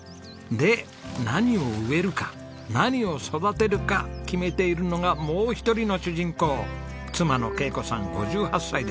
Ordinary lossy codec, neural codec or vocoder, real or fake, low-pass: none; none; real; none